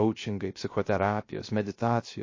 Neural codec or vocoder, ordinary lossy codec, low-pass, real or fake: codec, 16 kHz, 0.3 kbps, FocalCodec; MP3, 32 kbps; 7.2 kHz; fake